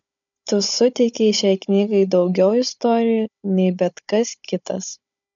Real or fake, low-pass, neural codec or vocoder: fake; 7.2 kHz; codec, 16 kHz, 16 kbps, FunCodec, trained on Chinese and English, 50 frames a second